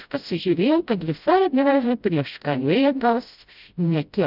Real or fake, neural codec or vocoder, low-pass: fake; codec, 16 kHz, 0.5 kbps, FreqCodec, smaller model; 5.4 kHz